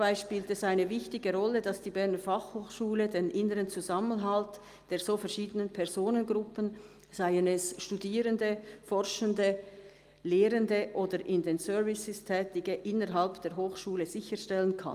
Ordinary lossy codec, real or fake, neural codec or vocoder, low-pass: Opus, 32 kbps; real; none; 14.4 kHz